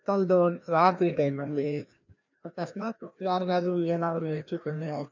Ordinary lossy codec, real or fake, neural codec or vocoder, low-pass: none; fake; codec, 16 kHz, 1 kbps, FreqCodec, larger model; 7.2 kHz